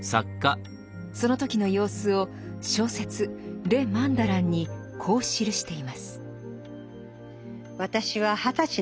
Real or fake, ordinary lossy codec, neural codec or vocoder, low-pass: real; none; none; none